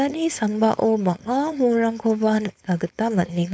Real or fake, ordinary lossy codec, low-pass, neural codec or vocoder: fake; none; none; codec, 16 kHz, 4.8 kbps, FACodec